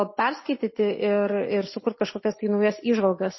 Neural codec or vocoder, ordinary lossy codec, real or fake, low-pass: none; MP3, 24 kbps; real; 7.2 kHz